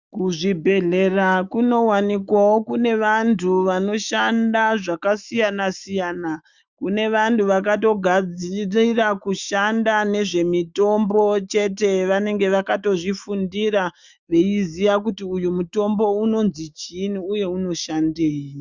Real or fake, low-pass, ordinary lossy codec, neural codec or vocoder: fake; 7.2 kHz; Opus, 64 kbps; autoencoder, 48 kHz, 128 numbers a frame, DAC-VAE, trained on Japanese speech